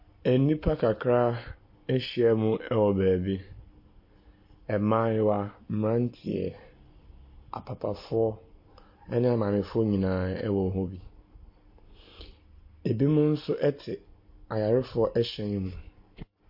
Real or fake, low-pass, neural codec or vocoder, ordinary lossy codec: real; 5.4 kHz; none; MP3, 32 kbps